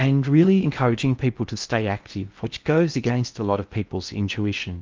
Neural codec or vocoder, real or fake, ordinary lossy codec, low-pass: codec, 16 kHz in and 24 kHz out, 0.6 kbps, FocalCodec, streaming, 4096 codes; fake; Opus, 24 kbps; 7.2 kHz